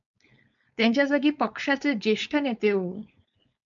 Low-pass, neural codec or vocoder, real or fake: 7.2 kHz; codec, 16 kHz, 4.8 kbps, FACodec; fake